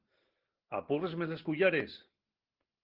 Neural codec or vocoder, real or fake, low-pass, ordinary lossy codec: none; real; 5.4 kHz; Opus, 16 kbps